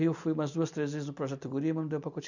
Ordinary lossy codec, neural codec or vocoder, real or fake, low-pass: none; none; real; 7.2 kHz